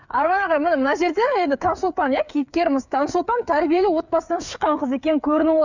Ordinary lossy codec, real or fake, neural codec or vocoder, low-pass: none; fake; codec, 16 kHz, 8 kbps, FreqCodec, smaller model; 7.2 kHz